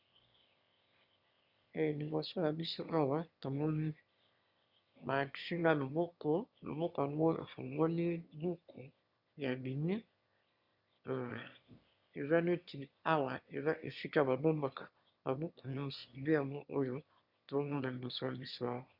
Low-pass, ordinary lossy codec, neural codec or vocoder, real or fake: 5.4 kHz; Opus, 64 kbps; autoencoder, 22.05 kHz, a latent of 192 numbers a frame, VITS, trained on one speaker; fake